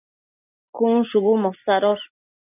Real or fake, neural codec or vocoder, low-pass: real; none; 3.6 kHz